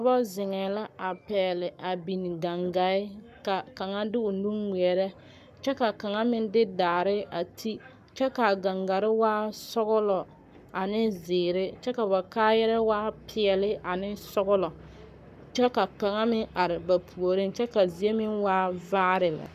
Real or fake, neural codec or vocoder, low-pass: fake; codec, 44.1 kHz, 7.8 kbps, Pupu-Codec; 14.4 kHz